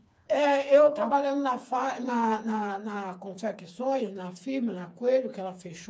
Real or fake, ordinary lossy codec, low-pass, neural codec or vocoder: fake; none; none; codec, 16 kHz, 4 kbps, FreqCodec, smaller model